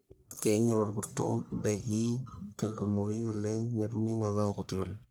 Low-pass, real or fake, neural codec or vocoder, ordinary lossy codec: none; fake; codec, 44.1 kHz, 1.7 kbps, Pupu-Codec; none